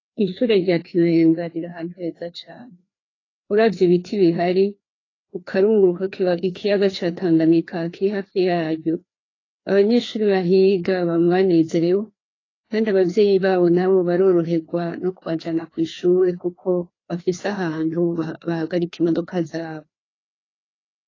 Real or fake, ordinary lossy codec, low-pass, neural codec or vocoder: fake; AAC, 32 kbps; 7.2 kHz; codec, 16 kHz, 2 kbps, FreqCodec, larger model